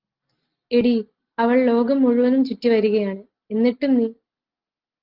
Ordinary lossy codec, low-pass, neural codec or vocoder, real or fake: Opus, 32 kbps; 5.4 kHz; none; real